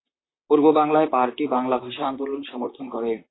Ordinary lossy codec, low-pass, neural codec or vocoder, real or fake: AAC, 16 kbps; 7.2 kHz; vocoder, 44.1 kHz, 128 mel bands, Pupu-Vocoder; fake